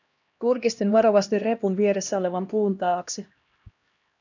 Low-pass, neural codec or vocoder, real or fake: 7.2 kHz; codec, 16 kHz, 1 kbps, X-Codec, HuBERT features, trained on LibriSpeech; fake